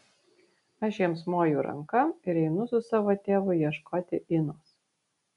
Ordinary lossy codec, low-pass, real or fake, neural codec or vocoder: MP3, 96 kbps; 10.8 kHz; real; none